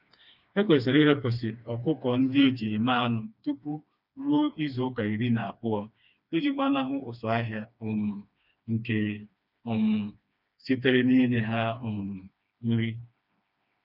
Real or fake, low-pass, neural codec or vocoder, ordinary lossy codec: fake; 5.4 kHz; codec, 16 kHz, 2 kbps, FreqCodec, smaller model; none